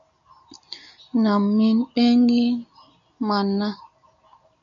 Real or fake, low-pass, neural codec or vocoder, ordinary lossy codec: real; 7.2 kHz; none; MP3, 96 kbps